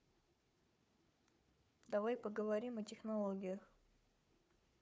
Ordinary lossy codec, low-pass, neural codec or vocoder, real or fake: none; none; codec, 16 kHz, 4 kbps, FunCodec, trained on Chinese and English, 50 frames a second; fake